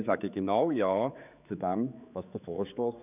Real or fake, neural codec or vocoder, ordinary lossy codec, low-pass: fake; codec, 16 kHz, 4 kbps, X-Codec, HuBERT features, trained on general audio; none; 3.6 kHz